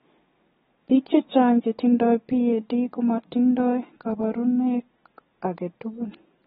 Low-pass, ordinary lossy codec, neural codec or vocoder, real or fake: 7.2 kHz; AAC, 16 kbps; none; real